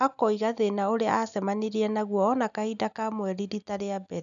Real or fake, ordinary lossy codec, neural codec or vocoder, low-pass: real; none; none; 7.2 kHz